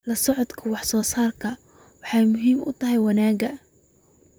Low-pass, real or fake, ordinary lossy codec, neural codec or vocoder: none; fake; none; vocoder, 44.1 kHz, 128 mel bands, Pupu-Vocoder